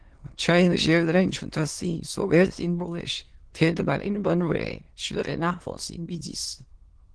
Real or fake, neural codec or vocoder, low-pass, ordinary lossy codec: fake; autoencoder, 22.05 kHz, a latent of 192 numbers a frame, VITS, trained on many speakers; 9.9 kHz; Opus, 16 kbps